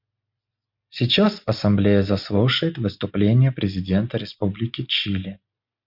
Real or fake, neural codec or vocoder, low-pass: real; none; 5.4 kHz